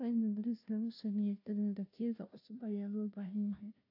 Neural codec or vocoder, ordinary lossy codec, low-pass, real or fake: codec, 16 kHz, 0.5 kbps, FunCodec, trained on Chinese and English, 25 frames a second; AAC, 48 kbps; 5.4 kHz; fake